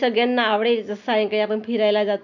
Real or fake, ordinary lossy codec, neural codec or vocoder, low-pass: real; none; none; 7.2 kHz